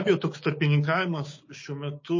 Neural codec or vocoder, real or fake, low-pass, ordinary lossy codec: codec, 16 kHz, 6 kbps, DAC; fake; 7.2 kHz; MP3, 32 kbps